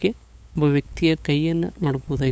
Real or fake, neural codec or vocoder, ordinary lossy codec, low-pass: fake; codec, 16 kHz, 8 kbps, FunCodec, trained on LibriTTS, 25 frames a second; none; none